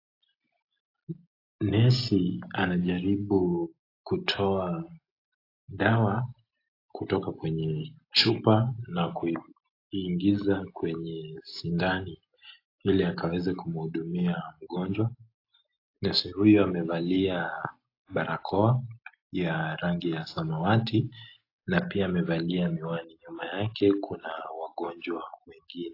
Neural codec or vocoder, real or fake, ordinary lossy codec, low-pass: none; real; AAC, 32 kbps; 5.4 kHz